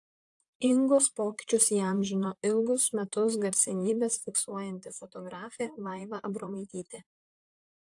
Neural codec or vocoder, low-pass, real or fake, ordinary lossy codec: vocoder, 44.1 kHz, 128 mel bands, Pupu-Vocoder; 10.8 kHz; fake; AAC, 64 kbps